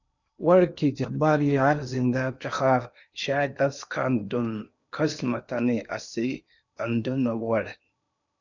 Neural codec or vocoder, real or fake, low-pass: codec, 16 kHz in and 24 kHz out, 0.8 kbps, FocalCodec, streaming, 65536 codes; fake; 7.2 kHz